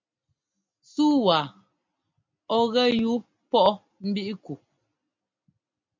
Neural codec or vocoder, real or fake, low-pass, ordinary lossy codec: none; real; 7.2 kHz; MP3, 64 kbps